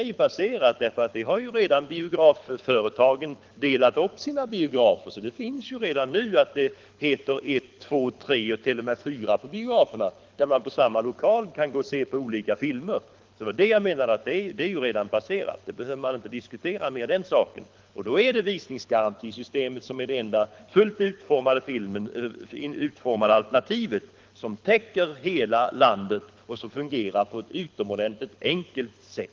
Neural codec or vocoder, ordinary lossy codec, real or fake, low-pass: codec, 24 kHz, 6 kbps, HILCodec; Opus, 32 kbps; fake; 7.2 kHz